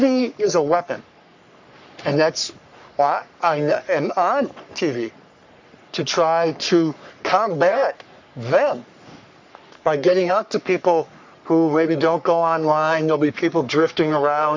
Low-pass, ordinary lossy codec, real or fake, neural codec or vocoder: 7.2 kHz; MP3, 64 kbps; fake; codec, 44.1 kHz, 3.4 kbps, Pupu-Codec